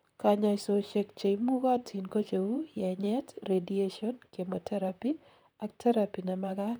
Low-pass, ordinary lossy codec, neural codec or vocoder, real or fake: none; none; vocoder, 44.1 kHz, 128 mel bands, Pupu-Vocoder; fake